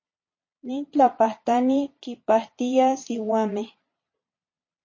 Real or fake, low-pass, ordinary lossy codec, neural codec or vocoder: fake; 7.2 kHz; MP3, 32 kbps; vocoder, 22.05 kHz, 80 mel bands, Vocos